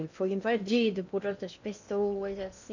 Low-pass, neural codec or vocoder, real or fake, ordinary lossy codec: 7.2 kHz; codec, 16 kHz in and 24 kHz out, 0.8 kbps, FocalCodec, streaming, 65536 codes; fake; none